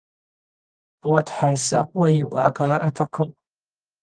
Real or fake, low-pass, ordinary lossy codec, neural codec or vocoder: fake; 9.9 kHz; Opus, 24 kbps; codec, 24 kHz, 0.9 kbps, WavTokenizer, medium music audio release